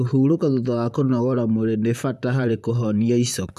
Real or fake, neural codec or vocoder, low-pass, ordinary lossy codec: fake; vocoder, 44.1 kHz, 128 mel bands, Pupu-Vocoder; 14.4 kHz; none